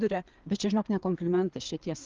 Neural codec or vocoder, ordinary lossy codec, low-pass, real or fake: codec, 16 kHz, 4 kbps, FreqCodec, larger model; Opus, 32 kbps; 7.2 kHz; fake